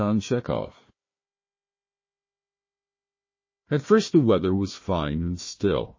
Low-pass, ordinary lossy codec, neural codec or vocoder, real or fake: 7.2 kHz; MP3, 32 kbps; codec, 16 kHz, 1 kbps, FunCodec, trained on Chinese and English, 50 frames a second; fake